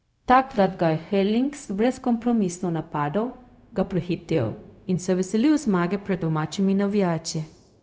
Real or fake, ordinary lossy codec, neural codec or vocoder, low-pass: fake; none; codec, 16 kHz, 0.4 kbps, LongCat-Audio-Codec; none